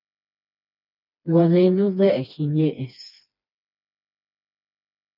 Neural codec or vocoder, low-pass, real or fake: codec, 16 kHz, 2 kbps, FreqCodec, smaller model; 5.4 kHz; fake